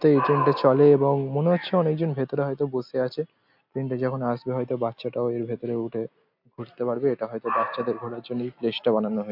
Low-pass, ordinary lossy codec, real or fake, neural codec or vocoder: 5.4 kHz; MP3, 48 kbps; real; none